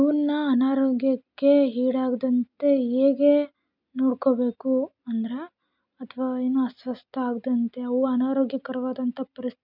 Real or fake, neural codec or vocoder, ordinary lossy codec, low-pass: real; none; none; 5.4 kHz